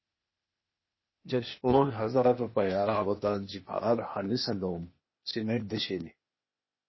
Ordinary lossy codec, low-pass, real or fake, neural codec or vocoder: MP3, 24 kbps; 7.2 kHz; fake; codec, 16 kHz, 0.8 kbps, ZipCodec